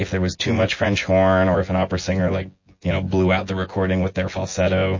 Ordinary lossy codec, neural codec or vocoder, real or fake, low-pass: MP3, 32 kbps; vocoder, 24 kHz, 100 mel bands, Vocos; fake; 7.2 kHz